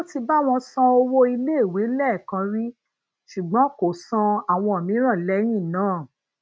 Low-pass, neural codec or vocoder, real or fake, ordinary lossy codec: none; none; real; none